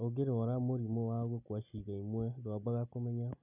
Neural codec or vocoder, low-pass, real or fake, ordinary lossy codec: none; 3.6 kHz; real; none